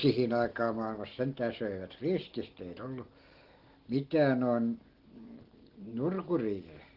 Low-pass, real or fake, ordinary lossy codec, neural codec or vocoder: 5.4 kHz; real; Opus, 16 kbps; none